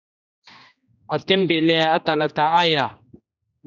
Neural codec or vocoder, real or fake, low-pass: codec, 16 kHz, 2 kbps, X-Codec, HuBERT features, trained on general audio; fake; 7.2 kHz